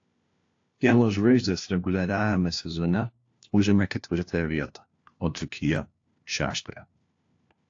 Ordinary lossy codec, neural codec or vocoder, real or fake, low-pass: AAC, 48 kbps; codec, 16 kHz, 1 kbps, FunCodec, trained on LibriTTS, 50 frames a second; fake; 7.2 kHz